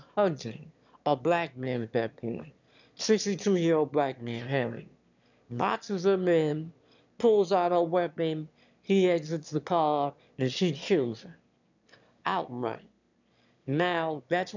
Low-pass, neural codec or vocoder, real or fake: 7.2 kHz; autoencoder, 22.05 kHz, a latent of 192 numbers a frame, VITS, trained on one speaker; fake